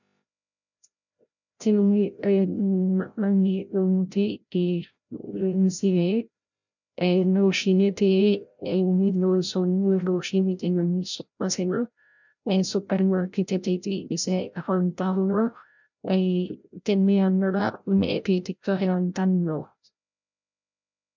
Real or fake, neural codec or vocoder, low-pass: fake; codec, 16 kHz, 0.5 kbps, FreqCodec, larger model; 7.2 kHz